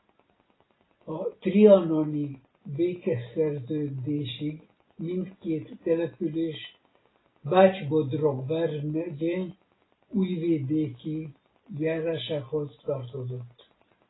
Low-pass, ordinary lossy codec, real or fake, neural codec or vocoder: 7.2 kHz; AAC, 16 kbps; real; none